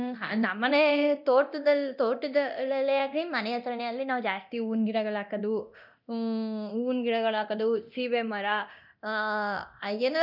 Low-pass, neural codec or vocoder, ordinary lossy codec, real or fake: 5.4 kHz; codec, 24 kHz, 0.9 kbps, DualCodec; none; fake